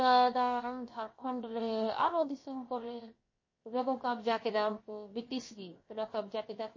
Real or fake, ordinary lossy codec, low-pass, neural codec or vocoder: fake; MP3, 32 kbps; 7.2 kHz; codec, 16 kHz, 0.7 kbps, FocalCodec